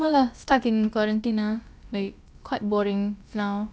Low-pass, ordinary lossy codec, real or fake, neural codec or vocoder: none; none; fake; codec, 16 kHz, about 1 kbps, DyCAST, with the encoder's durations